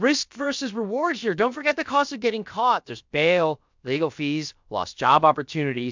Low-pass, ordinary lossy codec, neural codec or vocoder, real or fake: 7.2 kHz; MP3, 64 kbps; codec, 16 kHz, about 1 kbps, DyCAST, with the encoder's durations; fake